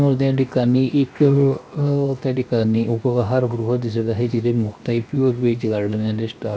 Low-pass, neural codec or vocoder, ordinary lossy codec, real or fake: none; codec, 16 kHz, 0.7 kbps, FocalCodec; none; fake